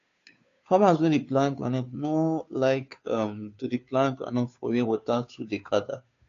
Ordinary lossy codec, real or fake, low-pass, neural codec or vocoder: AAC, 48 kbps; fake; 7.2 kHz; codec, 16 kHz, 2 kbps, FunCodec, trained on Chinese and English, 25 frames a second